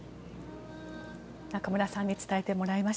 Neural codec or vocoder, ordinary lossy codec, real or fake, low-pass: none; none; real; none